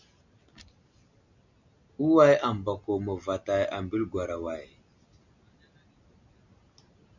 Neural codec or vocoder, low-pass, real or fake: none; 7.2 kHz; real